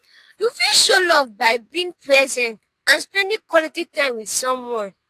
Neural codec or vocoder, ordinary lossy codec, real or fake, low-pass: codec, 44.1 kHz, 2.6 kbps, SNAC; AAC, 64 kbps; fake; 14.4 kHz